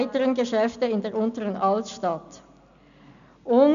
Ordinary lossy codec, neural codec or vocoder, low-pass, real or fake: none; none; 7.2 kHz; real